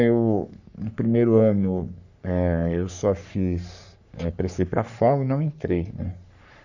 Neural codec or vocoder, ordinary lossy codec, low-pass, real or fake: codec, 44.1 kHz, 3.4 kbps, Pupu-Codec; none; 7.2 kHz; fake